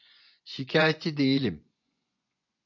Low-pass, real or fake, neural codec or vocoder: 7.2 kHz; fake; vocoder, 24 kHz, 100 mel bands, Vocos